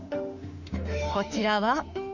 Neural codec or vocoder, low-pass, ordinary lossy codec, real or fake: autoencoder, 48 kHz, 32 numbers a frame, DAC-VAE, trained on Japanese speech; 7.2 kHz; none; fake